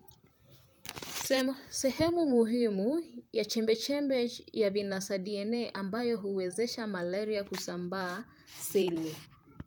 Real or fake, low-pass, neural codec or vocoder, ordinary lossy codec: fake; none; vocoder, 44.1 kHz, 128 mel bands, Pupu-Vocoder; none